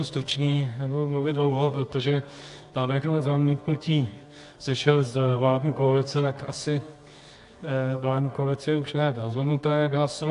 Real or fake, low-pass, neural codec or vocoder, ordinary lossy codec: fake; 10.8 kHz; codec, 24 kHz, 0.9 kbps, WavTokenizer, medium music audio release; AAC, 64 kbps